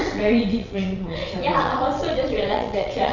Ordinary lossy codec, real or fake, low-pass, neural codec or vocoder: none; fake; 7.2 kHz; vocoder, 22.05 kHz, 80 mel bands, WaveNeXt